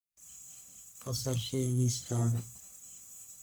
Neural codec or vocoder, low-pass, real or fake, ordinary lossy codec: codec, 44.1 kHz, 1.7 kbps, Pupu-Codec; none; fake; none